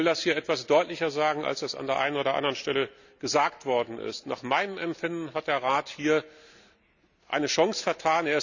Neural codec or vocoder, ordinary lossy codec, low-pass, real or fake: none; none; 7.2 kHz; real